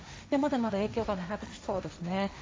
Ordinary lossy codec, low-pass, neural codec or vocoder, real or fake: none; none; codec, 16 kHz, 1.1 kbps, Voila-Tokenizer; fake